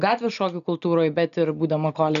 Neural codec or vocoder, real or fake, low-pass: none; real; 7.2 kHz